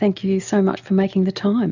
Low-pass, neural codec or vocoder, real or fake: 7.2 kHz; none; real